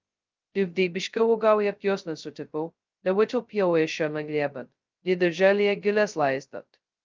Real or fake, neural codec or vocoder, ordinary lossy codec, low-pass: fake; codec, 16 kHz, 0.2 kbps, FocalCodec; Opus, 24 kbps; 7.2 kHz